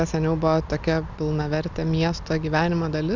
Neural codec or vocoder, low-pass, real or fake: none; 7.2 kHz; real